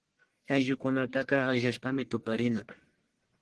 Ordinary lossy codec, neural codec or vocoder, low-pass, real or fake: Opus, 16 kbps; codec, 44.1 kHz, 1.7 kbps, Pupu-Codec; 10.8 kHz; fake